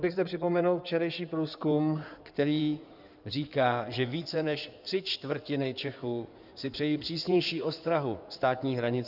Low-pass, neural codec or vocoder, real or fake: 5.4 kHz; codec, 16 kHz in and 24 kHz out, 2.2 kbps, FireRedTTS-2 codec; fake